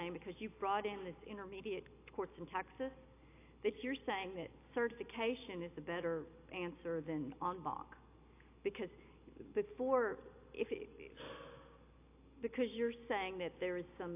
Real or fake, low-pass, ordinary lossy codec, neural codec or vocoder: real; 3.6 kHz; AAC, 24 kbps; none